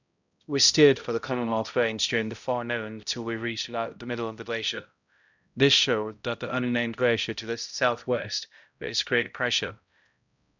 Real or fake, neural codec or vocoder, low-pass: fake; codec, 16 kHz, 0.5 kbps, X-Codec, HuBERT features, trained on balanced general audio; 7.2 kHz